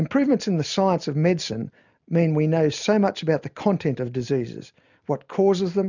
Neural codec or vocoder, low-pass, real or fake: none; 7.2 kHz; real